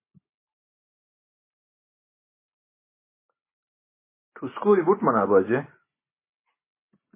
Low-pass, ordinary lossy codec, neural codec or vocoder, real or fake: 3.6 kHz; MP3, 16 kbps; none; real